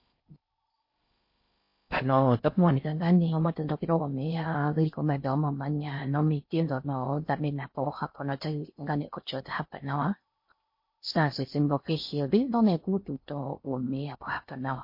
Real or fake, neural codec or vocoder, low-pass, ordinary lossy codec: fake; codec, 16 kHz in and 24 kHz out, 0.6 kbps, FocalCodec, streaming, 4096 codes; 5.4 kHz; MP3, 32 kbps